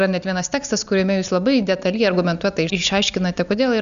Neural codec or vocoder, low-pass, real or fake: none; 7.2 kHz; real